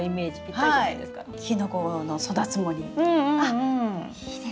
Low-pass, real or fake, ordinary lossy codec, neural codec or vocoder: none; real; none; none